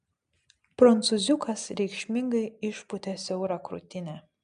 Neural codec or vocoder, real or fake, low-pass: none; real; 9.9 kHz